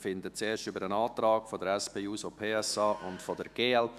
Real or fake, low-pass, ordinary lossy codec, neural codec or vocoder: fake; 14.4 kHz; none; autoencoder, 48 kHz, 128 numbers a frame, DAC-VAE, trained on Japanese speech